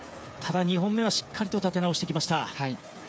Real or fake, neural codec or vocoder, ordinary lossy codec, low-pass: fake; codec, 16 kHz, 8 kbps, FreqCodec, smaller model; none; none